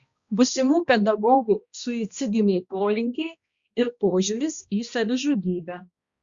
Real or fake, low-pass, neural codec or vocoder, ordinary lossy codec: fake; 7.2 kHz; codec, 16 kHz, 1 kbps, X-Codec, HuBERT features, trained on balanced general audio; Opus, 64 kbps